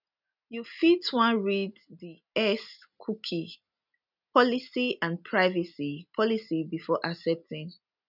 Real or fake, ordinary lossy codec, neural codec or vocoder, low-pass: real; none; none; 5.4 kHz